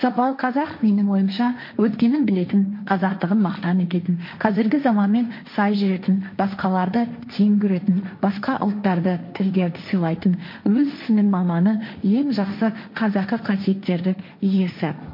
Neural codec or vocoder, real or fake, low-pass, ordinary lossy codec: codec, 16 kHz, 1.1 kbps, Voila-Tokenizer; fake; 5.4 kHz; MP3, 32 kbps